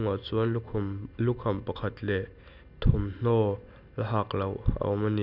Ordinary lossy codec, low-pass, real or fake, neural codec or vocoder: none; 5.4 kHz; real; none